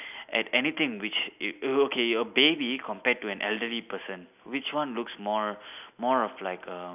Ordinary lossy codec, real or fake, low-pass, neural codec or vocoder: none; real; 3.6 kHz; none